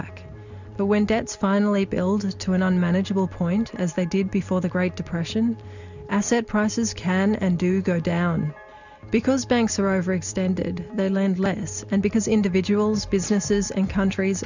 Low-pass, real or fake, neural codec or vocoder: 7.2 kHz; real; none